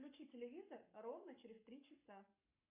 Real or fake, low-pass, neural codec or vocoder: fake; 3.6 kHz; codec, 44.1 kHz, 7.8 kbps, Pupu-Codec